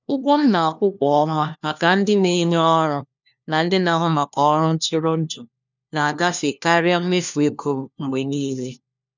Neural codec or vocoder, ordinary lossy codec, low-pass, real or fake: codec, 16 kHz, 1 kbps, FunCodec, trained on LibriTTS, 50 frames a second; none; 7.2 kHz; fake